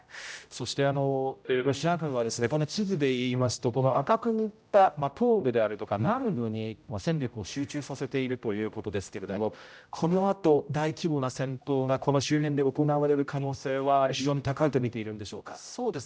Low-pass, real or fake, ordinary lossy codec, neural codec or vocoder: none; fake; none; codec, 16 kHz, 0.5 kbps, X-Codec, HuBERT features, trained on general audio